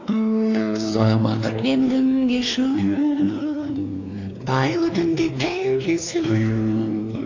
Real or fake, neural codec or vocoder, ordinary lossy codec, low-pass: fake; codec, 16 kHz, 2 kbps, X-Codec, WavLM features, trained on Multilingual LibriSpeech; AAC, 48 kbps; 7.2 kHz